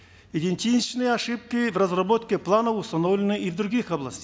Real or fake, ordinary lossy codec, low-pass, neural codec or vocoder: real; none; none; none